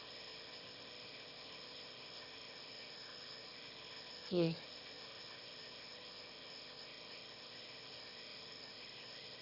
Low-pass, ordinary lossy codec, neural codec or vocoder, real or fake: 5.4 kHz; none; autoencoder, 22.05 kHz, a latent of 192 numbers a frame, VITS, trained on one speaker; fake